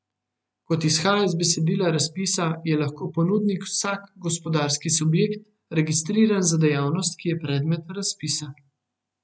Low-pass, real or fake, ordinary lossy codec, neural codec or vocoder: none; real; none; none